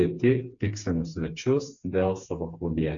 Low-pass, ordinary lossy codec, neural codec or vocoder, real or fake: 7.2 kHz; MP3, 64 kbps; codec, 16 kHz, 4 kbps, FreqCodec, smaller model; fake